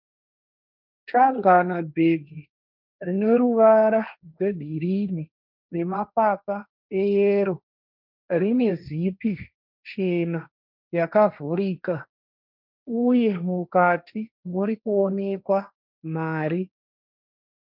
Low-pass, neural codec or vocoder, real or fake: 5.4 kHz; codec, 16 kHz, 1.1 kbps, Voila-Tokenizer; fake